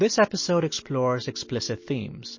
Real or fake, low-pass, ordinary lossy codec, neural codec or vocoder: real; 7.2 kHz; MP3, 32 kbps; none